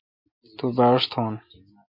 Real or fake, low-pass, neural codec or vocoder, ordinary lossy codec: real; 5.4 kHz; none; MP3, 32 kbps